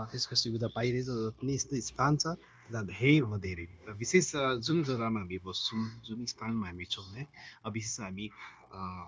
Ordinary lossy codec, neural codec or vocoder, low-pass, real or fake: none; codec, 16 kHz, 0.9 kbps, LongCat-Audio-Codec; none; fake